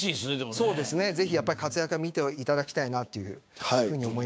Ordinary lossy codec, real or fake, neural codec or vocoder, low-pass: none; fake; codec, 16 kHz, 6 kbps, DAC; none